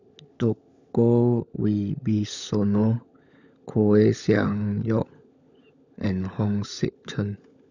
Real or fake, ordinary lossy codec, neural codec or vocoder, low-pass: fake; none; codec, 16 kHz, 16 kbps, FunCodec, trained on LibriTTS, 50 frames a second; 7.2 kHz